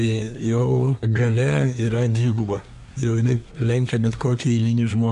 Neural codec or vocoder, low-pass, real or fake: codec, 24 kHz, 1 kbps, SNAC; 10.8 kHz; fake